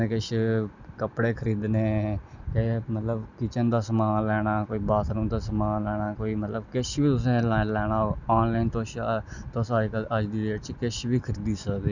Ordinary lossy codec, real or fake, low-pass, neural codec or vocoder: none; real; 7.2 kHz; none